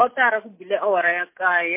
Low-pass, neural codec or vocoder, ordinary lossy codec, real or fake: 3.6 kHz; none; MP3, 24 kbps; real